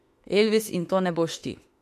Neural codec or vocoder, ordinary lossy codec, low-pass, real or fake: autoencoder, 48 kHz, 32 numbers a frame, DAC-VAE, trained on Japanese speech; MP3, 64 kbps; 14.4 kHz; fake